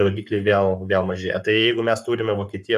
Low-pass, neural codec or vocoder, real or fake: 14.4 kHz; codec, 44.1 kHz, 7.8 kbps, Pupu-Codec; fake